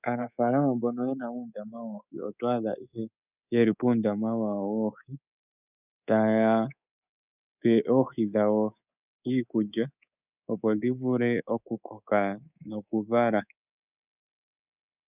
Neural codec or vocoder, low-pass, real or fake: codec, 24 kHz, 3.1 kbps, DualCodec; 3.6 kHz; fake